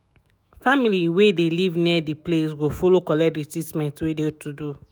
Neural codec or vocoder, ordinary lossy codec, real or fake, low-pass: autoencoder, 48 kHz, 128 numbers a frame, DAC-VAE, trained on Japanese speech; none; fake; none